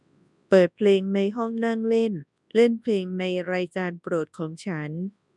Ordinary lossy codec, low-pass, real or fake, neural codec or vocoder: none; 10.8 kHz; fake; codec, 24 kHz, 0.9 kbps, WavTokenizer, large speech release